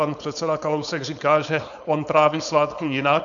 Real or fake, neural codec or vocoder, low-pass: fake; codec, 16 kHz, 4.8 kbps, FACodec; 7.2 kHz